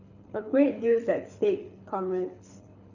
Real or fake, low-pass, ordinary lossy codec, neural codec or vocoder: fake; 7.2 kHz; none; codec, 24 kHz, 6 kbps, HILCodec